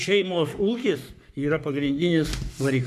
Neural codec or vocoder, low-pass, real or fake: codec, 44.1 kHz, 3.4 kbps, Pupu-Codec; 14.4 kHz; fake